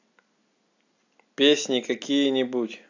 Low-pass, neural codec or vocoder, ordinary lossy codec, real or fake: 7.2 kHz; none; AAC, 48 kbps; real